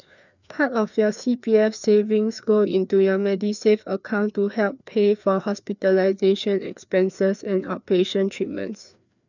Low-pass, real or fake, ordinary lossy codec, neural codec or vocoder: 7.2 kHz; fake; none; codec, 16 kHz, 2 kbps, FreqCodec, larger model